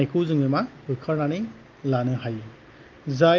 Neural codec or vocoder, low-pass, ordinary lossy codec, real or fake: none; 7.2 kHz; Opus, 32 kbps; real